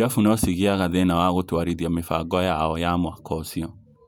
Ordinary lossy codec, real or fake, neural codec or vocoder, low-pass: none; fake; vocoder, 48 kHz, 128 mel bands, Vocos; 19.8 kHz